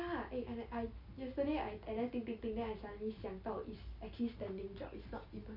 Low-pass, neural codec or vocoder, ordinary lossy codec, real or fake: 5.4 kHz; none; none; real